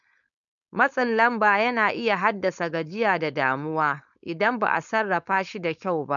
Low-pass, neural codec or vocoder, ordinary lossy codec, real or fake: 7.2 kHz; codec, 16 kHz, 4.8 kbps, FACodec; none; fake